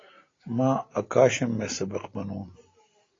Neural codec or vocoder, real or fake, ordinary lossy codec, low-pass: none; real; AAC, 32 kbps; 7.2 kHz